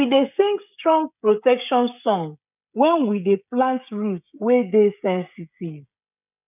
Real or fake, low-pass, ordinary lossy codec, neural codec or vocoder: fake; 3.6 kHz; none; codec, 16 kHz, 16 kbps, FreqCodec, smaller model